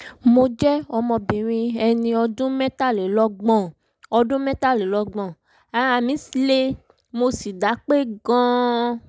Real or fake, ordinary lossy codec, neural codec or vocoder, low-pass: real; none; none; none